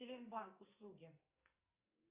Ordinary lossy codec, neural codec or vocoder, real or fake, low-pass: Opus, 64 kbps; codec, 44.1 kHz, 2.6 kbps, SNAC; fake; 3.6 kHz